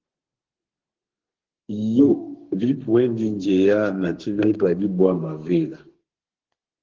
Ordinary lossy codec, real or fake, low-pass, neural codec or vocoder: Opus, 16 kbps; fake; 7.2 kHz; codec, 32 kHz, 1.9 kbps, SNAC